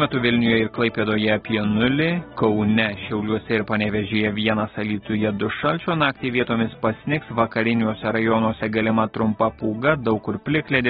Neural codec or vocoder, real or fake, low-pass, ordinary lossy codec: none; real; 7.2 kHz; AAC, 16 kbps